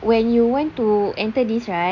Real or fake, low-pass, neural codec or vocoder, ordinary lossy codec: real; 7.2 kHz; none; none